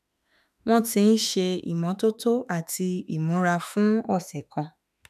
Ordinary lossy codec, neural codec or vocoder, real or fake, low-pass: none; autoencoder, 48 kHz, 32 numbers a frame, DAC-VAE, trained on Japanese speech; fake; 14.4 kHz